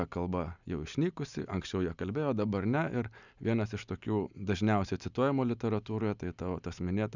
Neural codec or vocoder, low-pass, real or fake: none; 7.2 kHz; real